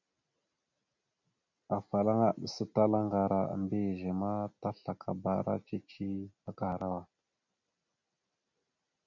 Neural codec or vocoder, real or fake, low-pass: none; real; 7.2 kHz